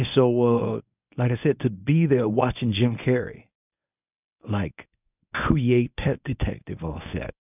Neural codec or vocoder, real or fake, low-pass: codec, 24 kHz, 0.9 kbps, WavTokenizer, medium speech release version 1; fake; 3.6 kHz